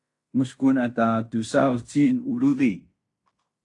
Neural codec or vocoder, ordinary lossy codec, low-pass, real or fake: codec, 16 kHz in and 24 kHz out, 0.9 kbps, LongCat-Audio-Codec, fine tuned four codebook decoder; AAC, 48 kbps; 10.8 kHz; fake